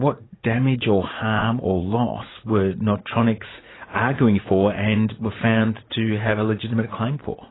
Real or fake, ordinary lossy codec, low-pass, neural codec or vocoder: fake; AAC, 16 kbps; 7.2 kHz; vocoder, 44.1 kHz, 80 mel bands, Vocos